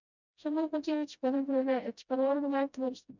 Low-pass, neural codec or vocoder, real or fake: 7.2 kHz; codec, 16 kHz, 0.5 kbps, FreqCodec, smaller model; fake